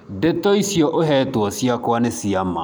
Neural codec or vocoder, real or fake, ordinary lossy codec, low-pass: none; real; none; none